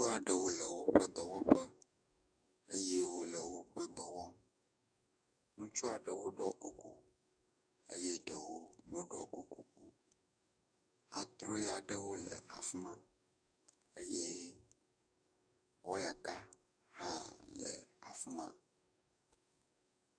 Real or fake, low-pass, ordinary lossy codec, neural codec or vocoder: fake; 9.9 kHz; MP3, 96 kbps; codec, 44.1 kHz, 2.6 kbps, DAC